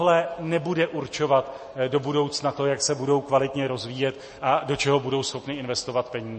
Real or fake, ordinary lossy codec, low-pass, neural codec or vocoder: real; MP3, 32 kbps; 10.8 kHz; none